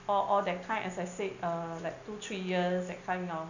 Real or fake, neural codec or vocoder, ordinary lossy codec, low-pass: real; none; none; 7.2 kHz